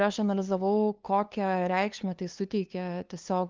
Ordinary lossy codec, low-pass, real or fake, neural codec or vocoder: Opus, 32 kbps; 7.2 kHz; fake; codec, 16 kHz, 2 kbps, FunCodec, trained on LibriTTS, 25 frames a second